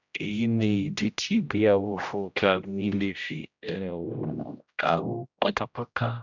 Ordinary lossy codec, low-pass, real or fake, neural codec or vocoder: none; 7.2 kHz; fake; codec, 16 kHz, 0.5 kbps, X-Codec, HuBERT features, trained on general audio